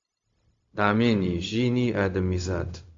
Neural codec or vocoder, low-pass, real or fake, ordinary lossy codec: codec, 16 kHz, 0.4 kbps, LongCat-Audio-Codec; 7.2 kHz; fake; AAC, 48 kbps